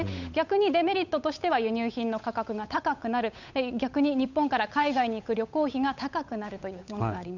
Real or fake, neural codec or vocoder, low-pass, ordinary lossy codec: fake; codec, 16 kHz, 8 kbps, FunCodec, trained on Chinese and English, 25 frames a second; 7.2 kHz; none